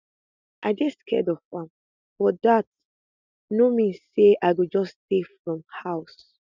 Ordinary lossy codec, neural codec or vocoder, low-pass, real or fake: none; none; 7.2 kHz; real